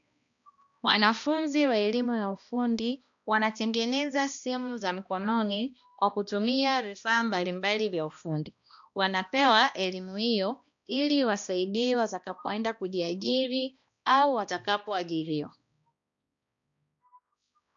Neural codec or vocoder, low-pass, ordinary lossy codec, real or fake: codec, 16 kHz, 1 kbps, X-Codec, HuBERT features, trained on balanced general audio; 7.2 kHz; AAC, 64 kbps; fake